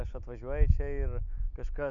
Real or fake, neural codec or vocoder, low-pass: real; none; 7.2 kHz